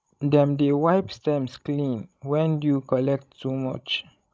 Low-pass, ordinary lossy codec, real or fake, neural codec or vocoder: none; none; fake; codec, 16 kHz, 16 kbps, FreqCodec, larger model